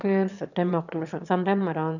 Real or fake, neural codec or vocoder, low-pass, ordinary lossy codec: fake; autoencoder, 22.05 kHz, a latent of 192 numbers a frame, VITS, trained on one speaker; 7.2 kHz; none